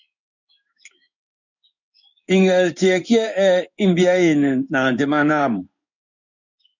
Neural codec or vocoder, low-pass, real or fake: codec, 16 kHz in and 24 kHz out, 1 kbps, XY-Tokenizer; 7.2 kHz; fake